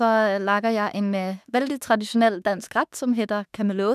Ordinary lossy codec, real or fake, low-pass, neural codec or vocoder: none; fake; 14.4 kHz; autoencoder, 48 kHz, 32 numbers a frame, DAC-VAE, trained on Japanese speech